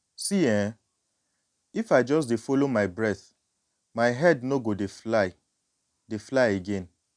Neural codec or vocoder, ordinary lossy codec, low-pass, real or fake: none; MP3, 96 kbps; 9.9 kHz; real